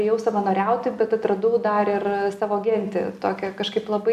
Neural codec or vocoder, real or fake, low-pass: none; real; 14.4 kHz